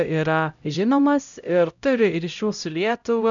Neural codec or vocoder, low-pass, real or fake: codec, 16 kHz, 0.5 kbps, X-Codec, HuBERT features, trained on LibriSpeech; 7.2 kHz; fake